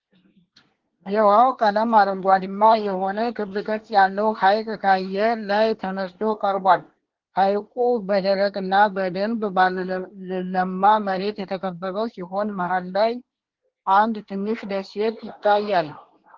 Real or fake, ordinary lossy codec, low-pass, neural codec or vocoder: fake; Opus, 16 kbps; 7.2 kHz; codec, 24 kHz, 1 kbps, SNAC